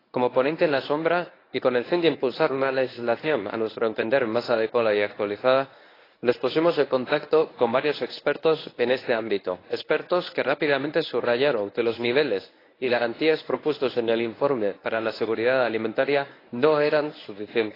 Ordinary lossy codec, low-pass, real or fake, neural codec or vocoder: AAC, 24 kbps; 5.4 kHz; fake; codec, 24 kHz, 0.9 kbps, WavTokenizer, medium speech release version 1